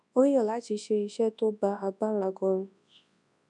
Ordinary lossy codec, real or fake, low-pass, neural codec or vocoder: none; fake; 10.8 kHz; codec, 24 kHz, 0.9 kbps, WavTokenizer, large speech release